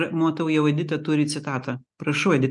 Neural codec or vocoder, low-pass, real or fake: none; 10.8 kHz; real